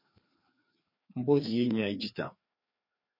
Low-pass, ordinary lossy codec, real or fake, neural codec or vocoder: 5.4 kHz; MP3, 32 kbps; fake; codec, 16 kHz, 2 kbps, FreqCodec, larger model